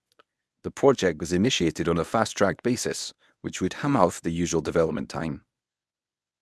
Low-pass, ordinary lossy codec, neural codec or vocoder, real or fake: none; none; codec, 24 kHz, 0.9 kbps, WavTokenizer, medium speech release version 1; fake